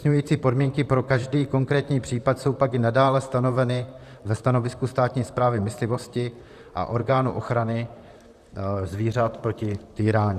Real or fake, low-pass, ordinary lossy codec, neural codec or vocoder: fake; 14.4 kHz; Opus, 64 kbps; vocoder, 44.1 kHz, 128 mel bands, Pupu-Vocoder